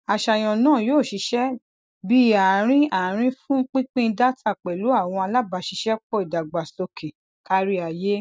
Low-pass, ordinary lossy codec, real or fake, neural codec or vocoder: none; none; real; none